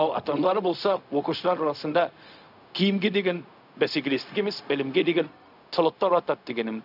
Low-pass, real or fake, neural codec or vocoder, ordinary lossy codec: 5.4 kHz; fake; codec, 16 kHz, 0.4 kbps, LongCat-Audio-Codec; none